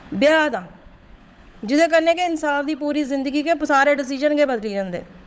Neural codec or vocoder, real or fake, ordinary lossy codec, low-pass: codec, 16 kHz, 16 kbps, FunCodec, trained on LibriTTS, 50 frames a second; fake; none; none